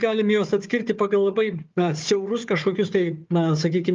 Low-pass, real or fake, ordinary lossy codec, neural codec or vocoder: 7.2 kHz; fake; Opus, 32 kbps; codec, 16 kHz, 4 kbps, FunCodec, trained on Chinese and English, 50 frames a second